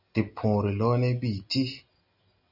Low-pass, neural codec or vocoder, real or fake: 5.4 kHz; none; real